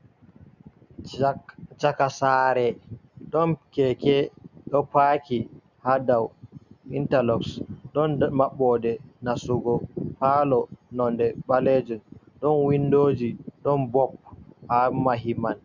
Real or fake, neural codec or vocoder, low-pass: real; none; 7.2 kHz